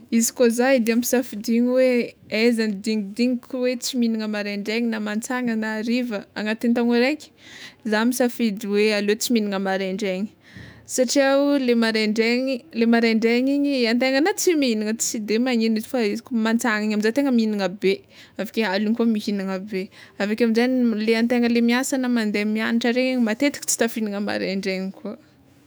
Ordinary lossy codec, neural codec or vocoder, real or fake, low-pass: none; autoencoder, 48 kHz, 128 numbers a frame, DAC-VAE, trained on Japanese speech; fake; none